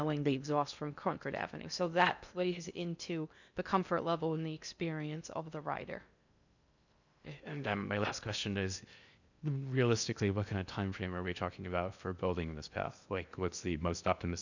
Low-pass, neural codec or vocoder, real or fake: 7.2 kHz; codec, 16 kHz in and 24 kHz out, 0.6 kbps, FocalCodec, streaming, 4096 codes; fake